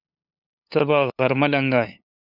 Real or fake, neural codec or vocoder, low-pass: fake; codec, 16 kHz, 8 kbps, FunCodec, trained on LibriTTS, 25 frames a second; 5.4 kHz